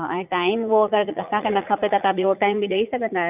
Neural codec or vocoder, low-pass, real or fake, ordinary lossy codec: vocoder, 44.1 kHz, 80 mel bands, Vocos; 3.6 kHz; fake; none